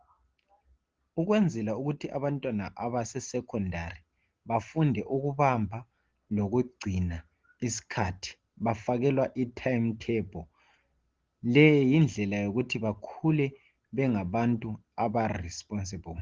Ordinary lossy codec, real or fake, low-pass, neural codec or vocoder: Opus, 16 kbps; real; 7.2 kHz; none